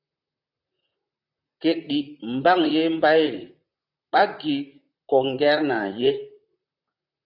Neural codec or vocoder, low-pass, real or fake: vocoder, 44.1 kHz, 128 mel bands, Pupu-Vocoder; 5.4 kHz; fake